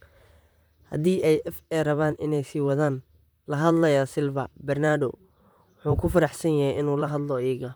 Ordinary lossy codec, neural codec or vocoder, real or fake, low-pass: none; vocoder, 44.1 kHz, 128 mel bands, Pupu-Vocoder; fake; none